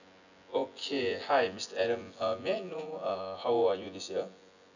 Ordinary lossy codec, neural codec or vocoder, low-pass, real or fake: none; vocoder, 24 kHz, 100 mel bands, Vocos; 7.2 kHz; fake